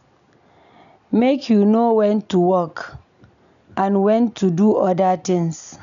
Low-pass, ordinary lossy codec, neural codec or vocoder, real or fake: 7.2 kHz; none; none; real